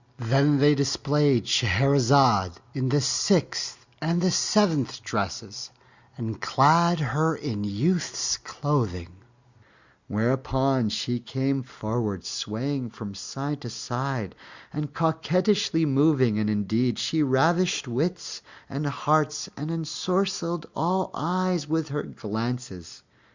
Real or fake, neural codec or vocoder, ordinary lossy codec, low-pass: real; none; Opus, 64 kbps; 7.2 kHz